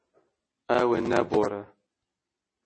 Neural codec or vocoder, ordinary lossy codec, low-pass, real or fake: none; MP3, 32 kbps; 9.9 kHz; real